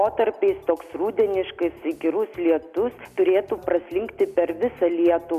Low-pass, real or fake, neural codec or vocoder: 14.4 kHz; real; none